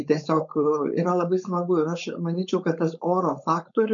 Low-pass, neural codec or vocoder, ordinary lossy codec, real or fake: 7.2 kHz; codec, 16 kHz, 4.8 kbps, FACodec; MP3, 64 kbps; fake